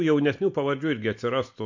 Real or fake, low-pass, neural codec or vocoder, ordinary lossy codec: real; 7.2 kHz; none; MP3, 48 kbps